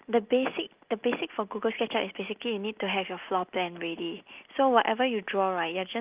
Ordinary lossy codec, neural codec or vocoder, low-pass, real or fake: Opus, 24 kbps; none; 3.6 kHz; real